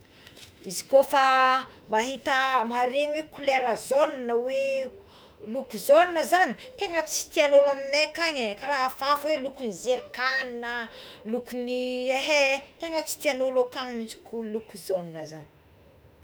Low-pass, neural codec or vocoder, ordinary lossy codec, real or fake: none; autoencoder, 48 kHz, 32 numbers a frame, DAC-VAE, trained on Japanese speech; none; fake